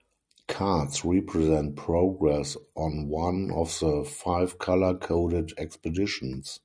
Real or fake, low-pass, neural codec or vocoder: real; 9.9 kHz; none